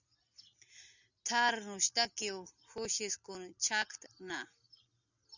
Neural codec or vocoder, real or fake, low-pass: none; real; 7.2 kHz